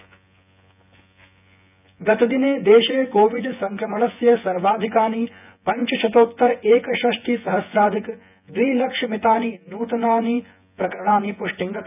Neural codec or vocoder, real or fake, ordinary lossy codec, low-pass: vocoder, 24 kHz, 100 mel bands, Vocos; fake; none; 3.6 kHz